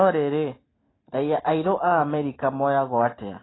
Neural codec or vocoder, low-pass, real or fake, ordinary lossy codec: none; 7.2 kHz; real; AAC, 16 kbps